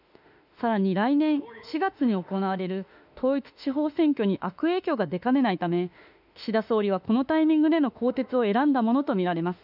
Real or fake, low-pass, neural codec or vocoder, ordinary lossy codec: fake; 5.4 kHz; autoencoder, 48 kHz, 32 numbers a frame, DAC-VAE, trained on Japanese speech; none